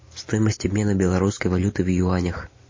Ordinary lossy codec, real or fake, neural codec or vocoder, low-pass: MP3, 32 kbps; real; none; 7.2 kHz